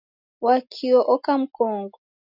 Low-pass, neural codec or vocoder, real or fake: 5.4 kHz; none; real